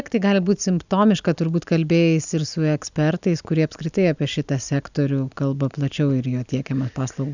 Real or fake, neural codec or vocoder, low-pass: real; none; 7.2 kHz